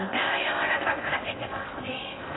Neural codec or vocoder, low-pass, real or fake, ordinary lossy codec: codec, 16 kHz in and 24 kHz out, 0.6 kbps, FocalCodec, streaming, 4096 codes; 7.2 kHz; fake; AAC, 16 kbps